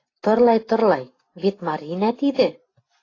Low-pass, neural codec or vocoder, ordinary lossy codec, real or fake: 7.2 kHz; none; AAC, 32 kbps; real